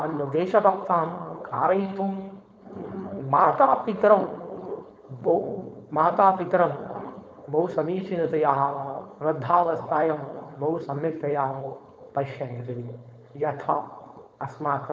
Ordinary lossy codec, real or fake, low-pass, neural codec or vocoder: none; fake; none; codec, 16 kHz, 4.8 kbps, FACodec